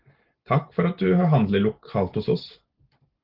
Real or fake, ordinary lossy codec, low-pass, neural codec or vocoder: real; Opus, 16 kbps; 5.4 kHz; none